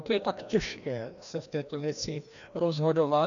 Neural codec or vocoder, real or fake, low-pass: codec, 16 kHz, 1 kbps, FreqCodec, larger model; fake; 7.2 kHz